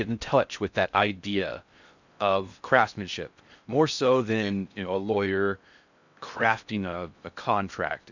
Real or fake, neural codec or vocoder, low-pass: fake; codec, 16 kHz in and 24 kHz out, 0.6 kbps, FocalCodec, streaming, 4096 codes; 7.2 kHz